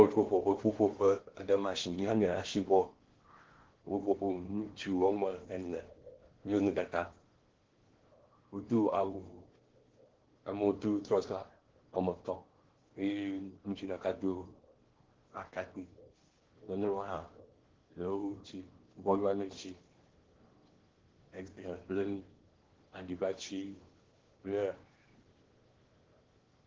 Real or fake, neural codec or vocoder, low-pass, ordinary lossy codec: fake; codec, 16 kHz in and 24 kHz out, 0.8 kbps, FocalCodec, streaming, 65536 codes; 7.2 kHz; Opus, 16 kbps